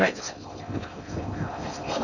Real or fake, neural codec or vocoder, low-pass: fake; codec, 16 kHz in and 24 kHz out, 0.6 kbps, FocalCodec, streaming, 4096 codes; 7.2 kHz